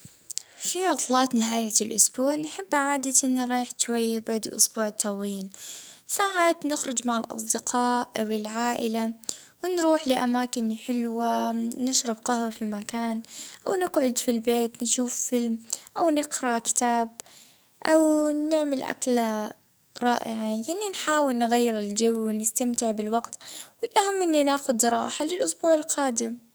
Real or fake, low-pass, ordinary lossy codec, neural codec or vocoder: fake; none; none; codec, 44.1 kHz, 2.6 kbps, SNAC